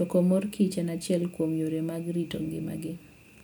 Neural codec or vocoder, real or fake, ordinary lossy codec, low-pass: none; real; none; none